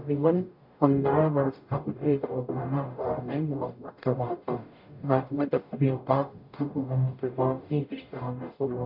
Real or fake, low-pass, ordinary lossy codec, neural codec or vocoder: fake; 5.4 kHz; MP3, 48 kbps; codec, 44.1 kHz, 0.9 kbps, DAC